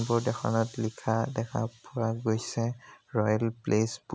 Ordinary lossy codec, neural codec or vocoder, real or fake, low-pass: none; none; real; none